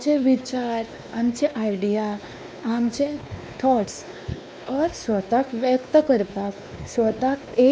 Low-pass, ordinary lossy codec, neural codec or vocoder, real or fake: none; none; codec, 16 kHz, 2 kbps, X-Codec, WavLM features, trained on Multilingual LibriSpeech; fake